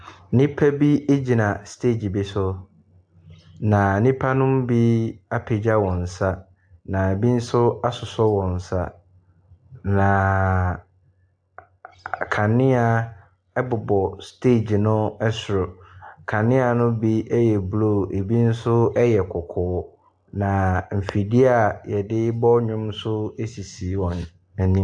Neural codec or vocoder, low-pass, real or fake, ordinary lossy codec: none; 9.9 kHz; real; AAC, 64 kbps